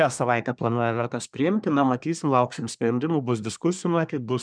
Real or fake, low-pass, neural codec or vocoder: fake; 9.9 kHz; codec, 24 kHz, 1 kbps, SNAC